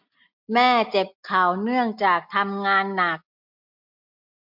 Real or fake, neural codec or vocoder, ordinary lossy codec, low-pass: real; none; MP3, 48 kbps; 5.4 kHz